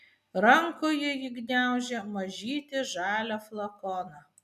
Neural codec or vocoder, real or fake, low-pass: none; real; 14.4 kHz